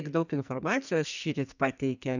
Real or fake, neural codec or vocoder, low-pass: fake; codec, 44.1 kHz, 2.6 kbps, SNAC; 7.2 kHz